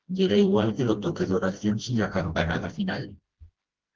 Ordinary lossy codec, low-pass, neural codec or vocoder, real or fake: Opus, 16 kbps; 7.2 kHz; codec, 16 kHz, 1 kbps, FreqCodec, smaller model; fake